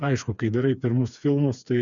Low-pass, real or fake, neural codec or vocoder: 7.2 kHz; fake; codec, 16 kHz, 4 kbps, FreqCodec, smaller model